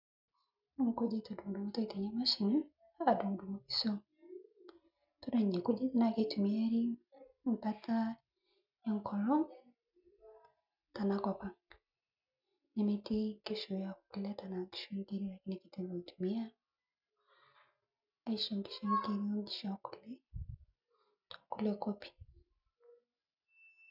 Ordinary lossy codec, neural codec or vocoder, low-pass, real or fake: none; none; 5.4 kHz; real